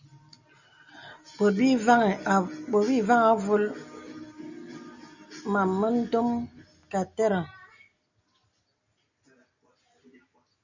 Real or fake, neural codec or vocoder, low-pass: real; none; 7.2 kHz